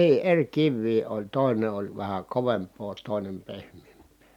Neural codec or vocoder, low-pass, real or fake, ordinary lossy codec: none; 19.8 kHz; real; MP3, 96 kbps